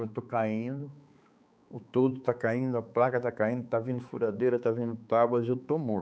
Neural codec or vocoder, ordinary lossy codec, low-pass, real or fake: codec, 16 kHz, 4 kbps, X-Codec, HuBERT features, trained on balanced general audio; none; none; fake